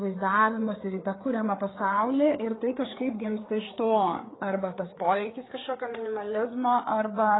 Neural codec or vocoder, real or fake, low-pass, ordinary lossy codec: codec, 16 kHz, 4 kbps, FreqCodec, larger model; fake; 7.2 kHz; AAC, 16 kbps